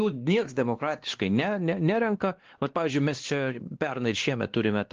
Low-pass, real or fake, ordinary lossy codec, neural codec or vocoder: 7.2 kHz; fake; Opus, 16 kbps; codec, 16 kHz, 2 kbps, X-Codec, WavLM features, trained on Multilingual LibriSpeech